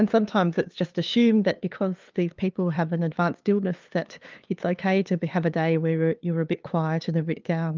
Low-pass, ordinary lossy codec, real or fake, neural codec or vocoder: 7.2 kHz; Opus, 24 kbps; fake; codec, 16 kHz, 2 kbps, FunCodec, trained on LibriTTS, 25 frames a second